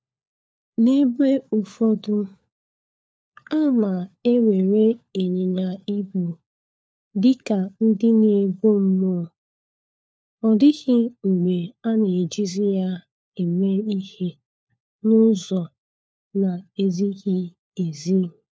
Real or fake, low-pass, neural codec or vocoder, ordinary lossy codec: fake; none; codec, 16 kHz, 4 kbps, FunCodec, trained on LibriTTS, 50 frames a second; none